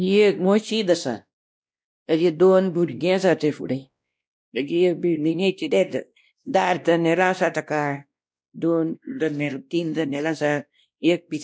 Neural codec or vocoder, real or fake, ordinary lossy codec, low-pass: codec, 16 kHz, 1 kbps, X-Codec, WavLM features, trained on Multilingual LibriSpeech; fake; none; none